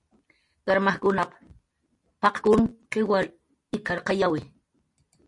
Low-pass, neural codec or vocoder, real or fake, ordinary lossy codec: 10.8 kHz; none; real; MP3, 48 kbps